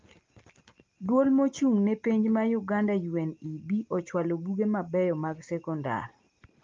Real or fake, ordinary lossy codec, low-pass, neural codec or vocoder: real; Opus, 32 kbps; 7.2 kHz; none